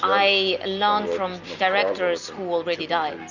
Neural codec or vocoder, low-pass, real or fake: none; 7.2 kHz; real